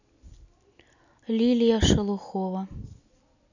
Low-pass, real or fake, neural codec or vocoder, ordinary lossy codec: 7.2 kHz; real; none; none